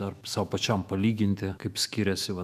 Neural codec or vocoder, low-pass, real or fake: autoencoder, 48 kHz, 128 numbers a frame, DAC-VAE, trained on Japanese speech; 14.4 kHz; fake